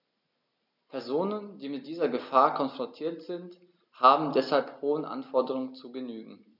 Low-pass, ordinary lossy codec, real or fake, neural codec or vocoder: 5.4 kHz; none; real; none